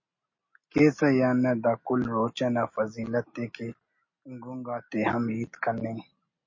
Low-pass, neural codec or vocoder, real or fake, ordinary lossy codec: 7.2 kHz; none; real; MP3, 32 kbps